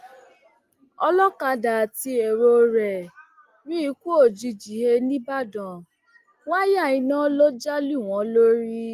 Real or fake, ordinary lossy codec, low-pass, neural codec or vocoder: real; Opus, 32 kbps; 14.4 kHz; none